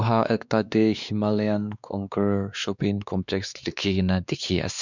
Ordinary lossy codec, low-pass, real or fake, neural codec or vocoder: none; 7.2 kHz; fake; codec, 16 kHz, 2 kbps, X-Codec, WavLM features, trained on Multilingual LibriSpeech